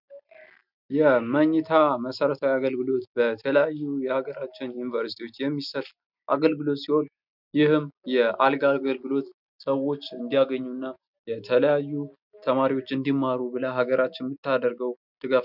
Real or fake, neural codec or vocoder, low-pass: real; none; 5.4 kHz